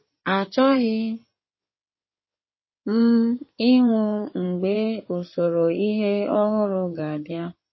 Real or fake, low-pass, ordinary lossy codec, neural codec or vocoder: fake; 7.2 kHz; MP3, 24 kbps; codec, 16 kHz in and 24 kHz out, 2.2 kbps, FireRedTTS-2 codec